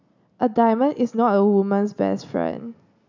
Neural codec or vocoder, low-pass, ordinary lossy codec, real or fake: none; 7.2 kHz; none; real